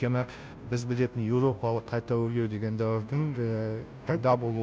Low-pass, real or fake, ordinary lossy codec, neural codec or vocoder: none; fake; none; codec, 16 kHz, 0.5 kbps, FunCodec, trained on Chinese and English, 25 frames a second